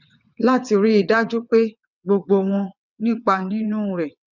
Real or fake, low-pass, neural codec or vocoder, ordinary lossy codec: fake; 7.2 kHz; vocoder, 22.05 kHz, 80 mel bands, WaveNeXt; none